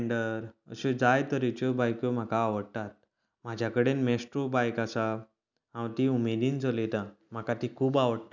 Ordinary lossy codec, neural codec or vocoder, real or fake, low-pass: none; none; real; 7.2 kHz